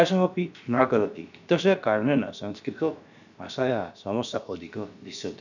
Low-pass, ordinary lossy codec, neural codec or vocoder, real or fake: 7.2 kHz; none; codec, 16 kHz, about 1 kbps, DyCAST, with the encoder's durations; fake